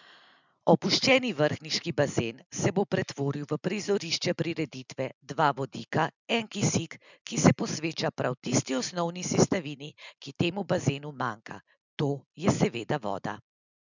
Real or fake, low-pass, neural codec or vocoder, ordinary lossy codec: real; 7.2 kHz; none; none